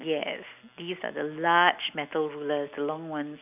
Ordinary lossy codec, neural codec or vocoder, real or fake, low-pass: none; none; real; 3.6 kHz